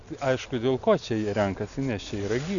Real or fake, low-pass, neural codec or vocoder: real; 7.2 kHz; none